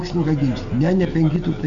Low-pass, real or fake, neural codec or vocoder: 7.2 kHz; real; none